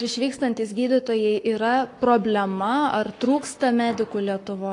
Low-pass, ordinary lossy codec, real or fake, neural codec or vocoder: 10.8 kHz; AAC, 48 kbps; fake; codec, 44.1 kHz, 7.8 kbps, Pupu-Codec